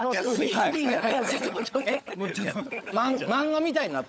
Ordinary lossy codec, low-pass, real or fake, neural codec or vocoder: none; none; fake; codec, 16 kHz, 16 kbps, FunCodec, trained on LibriTTS, 50 frames a second